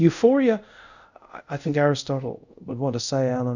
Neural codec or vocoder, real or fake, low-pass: codec, 24 kHz, 0.5 kbps, DualCodec; fake; 7.2 kHz